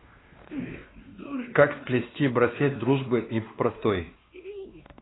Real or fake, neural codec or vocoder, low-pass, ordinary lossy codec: fake; codec, 16 kHz, 2 kbps, X-Codec, WavLM features, trained on Multilingual LibriSpeech; 7.2 kHz; AAC, 16 kbps